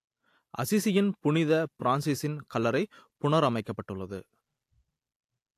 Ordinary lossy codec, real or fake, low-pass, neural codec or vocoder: AAC, 64 kbps; real; 14.4 kHz; none